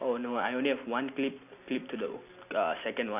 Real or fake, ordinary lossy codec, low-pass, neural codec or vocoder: real; none; 3.6 kHz; none